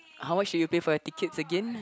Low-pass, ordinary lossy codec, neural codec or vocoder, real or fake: none; none; none; real